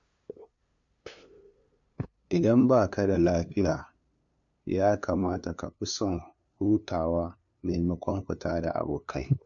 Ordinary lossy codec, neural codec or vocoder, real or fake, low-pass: MP3, 48 kbps; codec, 16 kHz, 2 kbps, FunCodec, trained on LibriTTS, 25 frames a second; fake; 7.2 kHz